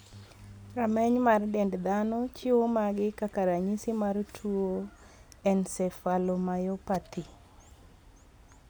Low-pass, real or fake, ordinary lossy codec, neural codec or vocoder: none; real; none; none